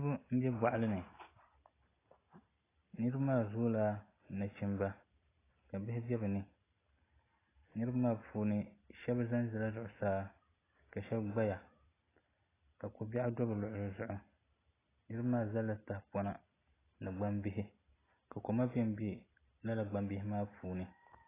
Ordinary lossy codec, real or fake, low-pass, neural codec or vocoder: AAC, 16 kbps; real; 3.6 kHz; none